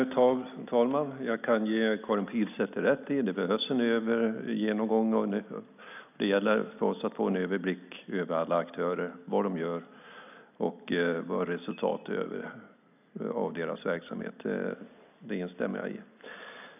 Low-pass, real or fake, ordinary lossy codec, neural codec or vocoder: 3.6 kHz; real; none; none